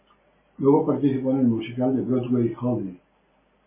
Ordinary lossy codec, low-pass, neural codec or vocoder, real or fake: MP3, 16 kbps; 3.6 kHz; none; real